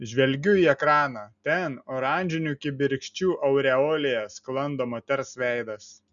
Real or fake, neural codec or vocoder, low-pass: real; none; 7.2 kHz